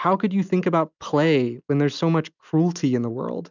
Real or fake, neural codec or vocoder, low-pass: real; none; 7.2 kHz